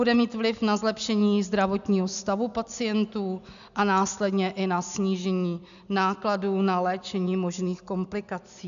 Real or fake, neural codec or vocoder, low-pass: real; none; 7.2 kHz